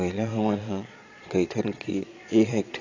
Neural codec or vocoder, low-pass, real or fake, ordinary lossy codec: vocoder, 22.05 kHz, 80 mel bands, Vocos; 7.2 kHz; fake; AAC, 32 kbps